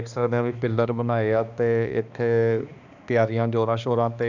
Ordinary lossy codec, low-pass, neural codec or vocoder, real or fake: none; 7.2 kHz; codec, 16 kHz, 2 kbps, X-Codec, HuBERT features, trained on balanced general audio; fake